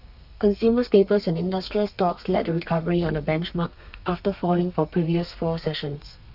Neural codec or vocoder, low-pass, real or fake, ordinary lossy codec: codec, 32 kHz, 1.9 kbps, SNAC; 5.4 kHz; fake; none